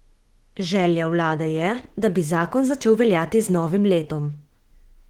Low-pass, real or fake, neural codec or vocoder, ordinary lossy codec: 19.8 kHz; fake; autoencoder, 48 kHz, 32 numbers a frame, DAC-VAE, trained on Japanese speech; Opus, 16 kbps